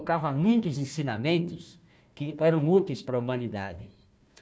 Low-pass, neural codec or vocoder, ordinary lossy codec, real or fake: none; codec, 16 kHz, 1 kbps, FunCodec, trained on Chinese and English, 50 frames a second; none; fake